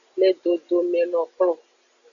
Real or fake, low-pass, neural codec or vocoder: real; 7.2 kHz; none